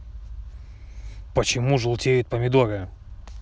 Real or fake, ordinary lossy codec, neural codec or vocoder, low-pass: real; none; none; none